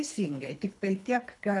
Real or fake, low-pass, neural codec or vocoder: fake; 10.8 kHz; codec, 24 kHz, 3 kbps, HILCodec